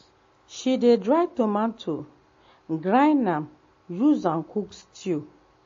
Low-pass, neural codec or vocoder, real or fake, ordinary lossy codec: 7.2 kHz; none; real; MP3, 32 kbps